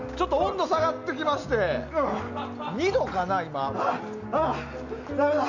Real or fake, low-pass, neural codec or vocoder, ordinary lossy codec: real; 7.2 kHz; none; none